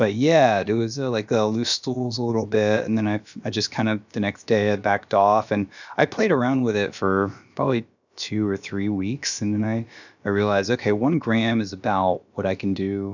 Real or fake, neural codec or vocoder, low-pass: fake; codec, 16 kHz, about 1 kbps, DyCAST, with the encoder's durations; 7.2 kHz